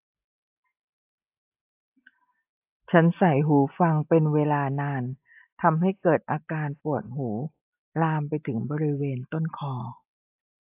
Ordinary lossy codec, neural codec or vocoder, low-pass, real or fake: AAC, 32 kbps; none; 3.6 kHz; real